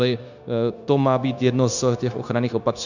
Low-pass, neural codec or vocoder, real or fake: 7.2 kHz; codec, 16 kHz, 0.9 kbps, LongCat-Audio-Codec; fake